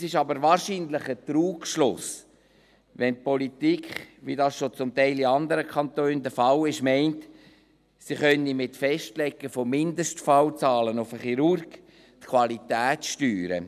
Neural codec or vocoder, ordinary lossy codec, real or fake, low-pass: none; none; real; 14.4 kHz